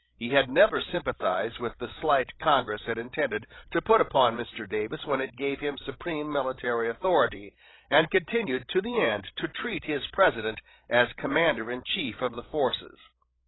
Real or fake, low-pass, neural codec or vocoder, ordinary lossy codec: fake; 7.2 kHz; codec, 16 kHz, 16 kbps, FreqCodec, larger model; AAC, 16 kbps